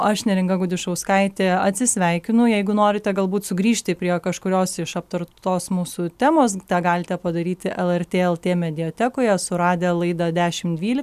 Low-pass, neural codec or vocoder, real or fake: 14.4 kHz; none; real